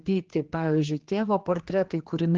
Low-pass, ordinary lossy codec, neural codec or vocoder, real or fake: 7.2 kHz; Opus, 16 kbps; codec, 16 kHz, 2 kbps, X-Codec, HuBERT features, trained on general audio; fake